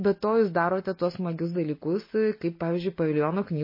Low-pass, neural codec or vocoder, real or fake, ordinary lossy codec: 5.4 kHz; none; real; MP3, 24 kbps